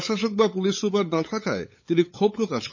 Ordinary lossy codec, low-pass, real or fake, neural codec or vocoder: MP3, 32 kbps; 7.2 kHz; fake; codec, 16 kHz, 8 kbps, FunCodec, trained on LibriTTS, 25 frames a second